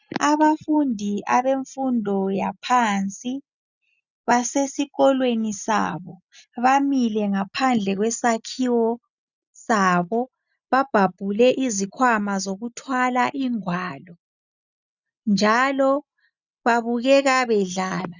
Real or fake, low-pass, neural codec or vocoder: real; 7.2 kHz; none